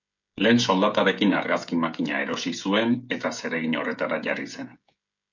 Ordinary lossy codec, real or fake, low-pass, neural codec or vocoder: MP3, 48 kbps; fake; 7.2 kHz; codec, 16 kHz, 16 kbps, FreqCodec, smaller model